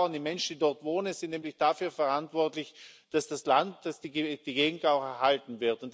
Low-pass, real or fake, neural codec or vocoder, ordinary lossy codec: none; real; none; none